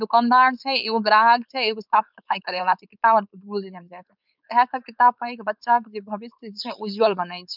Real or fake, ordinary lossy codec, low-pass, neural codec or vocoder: fake; none; 5.4 kHz; codec, 16 kHz, 4.8 kbps, FACodec